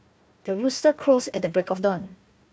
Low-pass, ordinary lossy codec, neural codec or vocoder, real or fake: none; none; codec, 16 kHz, 1 kbps, FunCodec, trained on Chinese and English, 50 frames a second; fake